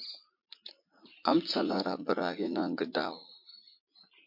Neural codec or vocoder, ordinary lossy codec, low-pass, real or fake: vocoder, 44.1 kHz, 80 mel bands, Vocos; MP3, 32 kbps; 5.4 kHz; fake